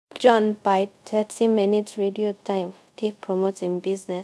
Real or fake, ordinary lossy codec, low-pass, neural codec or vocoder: fake; none; none; codec, 24 kHz, 0.5 kbps, DualCodec